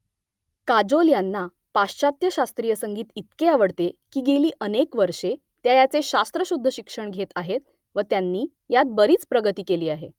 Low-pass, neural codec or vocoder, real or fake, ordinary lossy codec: 19.8 kHz; none; real; Opus, 32 kbps